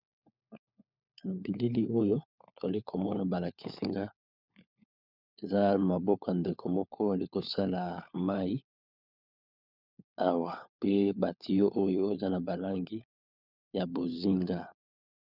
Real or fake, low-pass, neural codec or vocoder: fake; 5.4 kHz; codec, 16 kHz, 4 kbps, FunCodec, trained on LibriTTS, 50 frames a second